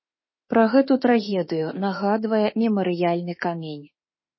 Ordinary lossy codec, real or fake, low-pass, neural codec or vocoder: MP3, 24 kbps; fake; 7.2 kHz; autoencoder, 48 kHz, 32 numbers a frame, DAC-VAE, trained on Japanese speech